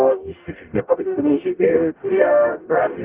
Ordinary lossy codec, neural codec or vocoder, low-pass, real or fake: Opus, 32 kbps; codec, 44.1 kHz, 0.9 kbps, DAC; 3.6 kHz; fake